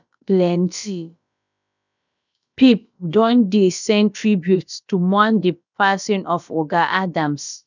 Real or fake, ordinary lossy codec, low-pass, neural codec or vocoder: fake; none; 7.2 kHz; codec, 16 kHz, about 1 kbps, DyCAST, with the encoder's durations